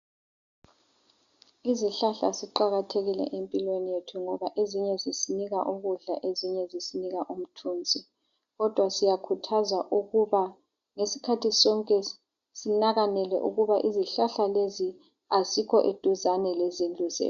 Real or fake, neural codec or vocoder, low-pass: real; none; 7.2 kHz